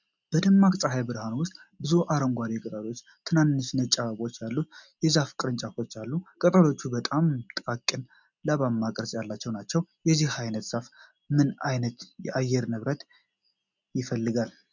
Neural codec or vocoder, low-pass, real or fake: none; 7.2 kHz; real